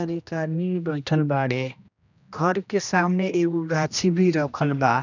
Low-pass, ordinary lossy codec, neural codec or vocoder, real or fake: 7.2 kHz; none; codec, 16 kHz, 1 kbps, X-Codec, HuBERT features, trained on general audio; fake